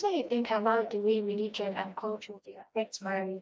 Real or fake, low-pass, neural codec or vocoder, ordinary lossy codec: fake; none; codec, 16 kHz, 1 kbps, FreqCodec, smaller model; none